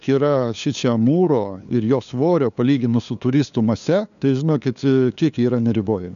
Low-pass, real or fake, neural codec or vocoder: 7.2 kHz; fake; codec, 16 kHz, 2 kbps, FunCodec, trained on LibriTTS, 25 frames a second